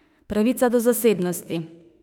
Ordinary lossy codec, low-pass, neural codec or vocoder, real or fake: none; 19.8 kHz; autoencoder, 48 kHz, 32 numbers a frame, DAC-VAE, trained on Japanese speech; fake